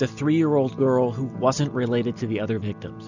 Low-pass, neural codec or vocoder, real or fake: 7.2 kHz; none; real